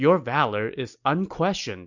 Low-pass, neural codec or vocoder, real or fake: 7.2 kHz; none; real